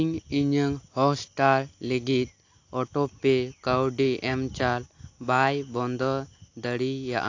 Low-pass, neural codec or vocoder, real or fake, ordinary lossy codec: 7.2 kHz; none; real; AAC, 48 kbps